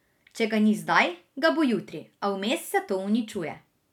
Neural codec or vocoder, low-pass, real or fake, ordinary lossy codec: none; 19.8 kHz; real; none